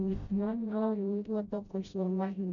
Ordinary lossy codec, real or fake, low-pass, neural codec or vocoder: none; fake; 7.2 kHz; codec, 16 kHz, 0.5 kbps, FreqCodec, smaller model